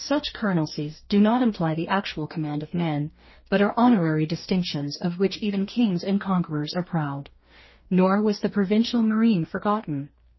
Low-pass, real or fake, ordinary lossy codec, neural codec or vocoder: 7.2 kHz; fake; MP3, 24 kbps; codec, 44.1 kHz, 2.6 kbps, DAC